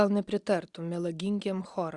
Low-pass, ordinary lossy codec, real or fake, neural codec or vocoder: 10.8 kHz; Opus, 64 kbps; real; none